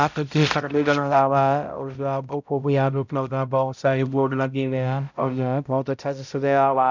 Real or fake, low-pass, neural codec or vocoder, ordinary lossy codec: fake; 7.2 kHz; codec, 16 kHz, 0.5 kbps, X-Codec, HuBERT features, trained on balanced general audio; none